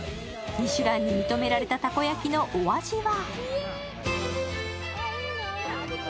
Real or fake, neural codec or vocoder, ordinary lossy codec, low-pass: real; none; none; none